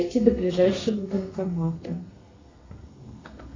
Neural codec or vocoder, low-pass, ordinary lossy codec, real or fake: codec, 32 kHz, 1.9 kbps, SNAC; 7.2 kHz; AAC, 32 kbps; fake